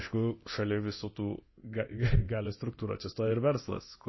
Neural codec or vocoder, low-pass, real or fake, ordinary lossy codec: codec, 24 kHz, 0.9 kbps, DualCodec; 7.2 kHz; fake; MP3, 24 kbps